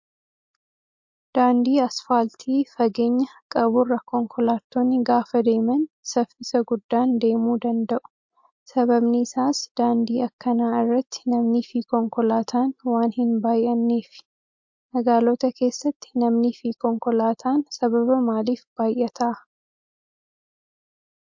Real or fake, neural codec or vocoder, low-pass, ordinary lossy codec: real; none; 7.2 kHz; MP3, 48 kbps